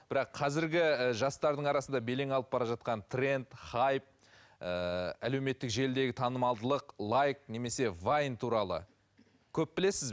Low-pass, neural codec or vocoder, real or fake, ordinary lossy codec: none; none; real; none